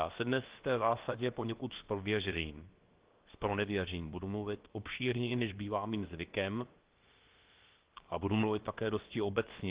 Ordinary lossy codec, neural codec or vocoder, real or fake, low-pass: Opus, 16 kbps; codec, 16 kHz, about 1 kbps, DyCAST, with the encoder's durations; fake; 3.6 kHz